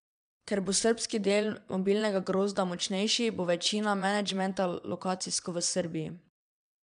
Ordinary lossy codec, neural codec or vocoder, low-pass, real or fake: none; vocoder, 22.05 kHz, 80 mel bands, Vocos; 9.9 kHz; fake